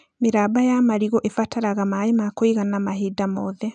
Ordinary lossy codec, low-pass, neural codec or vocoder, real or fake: none; 9.9 kHz; none; real